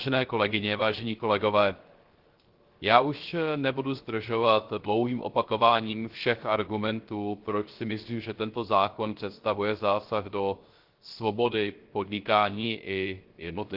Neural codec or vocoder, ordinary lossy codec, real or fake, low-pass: codec, 16 kHz, 0.3 kbps, FocalCodec; Opus, 16 kbps; fake; 5.4 kHz